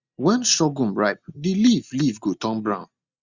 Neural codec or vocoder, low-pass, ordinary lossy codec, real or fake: none; 7.2 kHz; Opus, 64 kbps; real